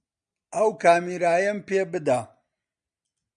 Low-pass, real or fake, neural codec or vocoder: 9.9 kHz; real; none